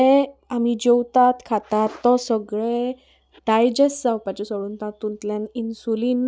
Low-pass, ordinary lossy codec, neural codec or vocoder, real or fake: none; none; none; real